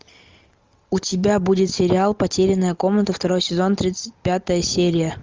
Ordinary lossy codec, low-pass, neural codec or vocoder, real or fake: Opus, 32 kbps; 7.2 kHz; none; real